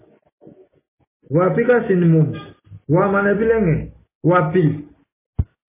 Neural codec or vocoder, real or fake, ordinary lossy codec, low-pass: none; real; MP3, 16 kbps; 3.6 kHz